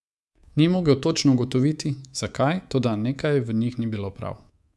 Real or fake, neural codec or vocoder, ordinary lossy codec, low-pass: fake; codec, 24 kHz, 3.1 kbps, DualCodec; none; none